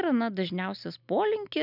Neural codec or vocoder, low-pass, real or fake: none; 5.4 kHz; real